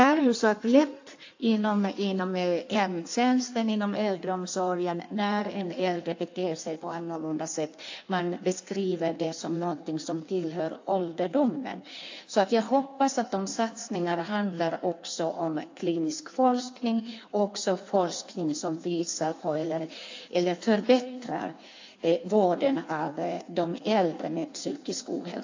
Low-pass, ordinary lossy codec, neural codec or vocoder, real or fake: 7.2 kHz; AAC, 48 kbps; codec, 16 kHz in and 24 kHz out, 1.1 kbps, FireRedTTS-2 codec; fake